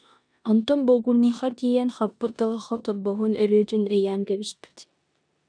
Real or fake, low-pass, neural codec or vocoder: fake; 9.9 kHz; codec, 16 kHz in and 24 kHz out, 0.9 kbps, LongCat-Audio-Codec, four codebook decoder